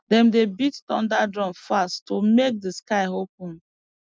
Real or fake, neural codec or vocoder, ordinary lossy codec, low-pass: real; none; none; none